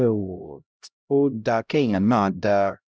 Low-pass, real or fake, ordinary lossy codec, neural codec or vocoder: none; fake; none; codec, 16 kHz, 0.5 kbps, X-Codec, HuBERT features, trained on LibriSpeech